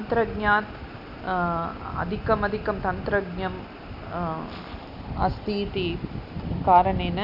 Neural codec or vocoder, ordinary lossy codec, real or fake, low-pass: none; none; real; 5.4 kHz